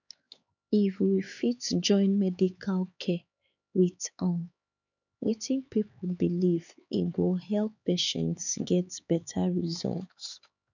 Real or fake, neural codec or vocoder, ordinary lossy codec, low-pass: fake; codec, 16 kHz, 4 kbps, X-Codec, HuBERT features, trained on LibriSpeech; none; 7.2 kHz